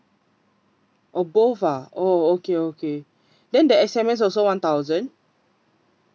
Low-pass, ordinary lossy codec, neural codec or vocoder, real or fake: none; none; none; real